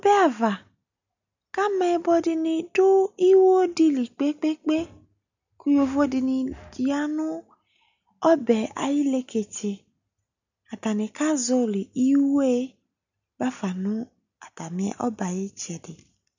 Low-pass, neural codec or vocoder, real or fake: 7.2 kHz; none; real